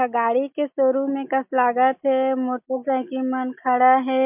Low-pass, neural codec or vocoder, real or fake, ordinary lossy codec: 3.6 kHz; none; real; MP3, 32 kbps